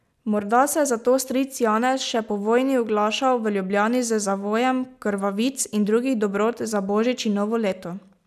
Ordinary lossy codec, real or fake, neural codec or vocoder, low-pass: none; real; none; 14.4 kHz